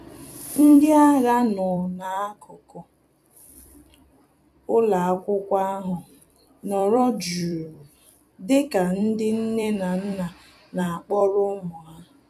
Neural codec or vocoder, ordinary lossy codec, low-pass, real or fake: vocoder, 44.1 kHz, 128 mel bands every 256 samples, BigVGAN v2; none; 14.4 kHz; fake